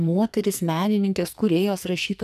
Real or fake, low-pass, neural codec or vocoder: fake; 14.4 kHz; codec, 44.1 kHz, 2.6 kbps, SNAC